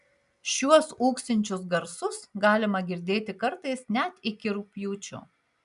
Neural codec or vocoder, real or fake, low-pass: none; real; 10.8 kHz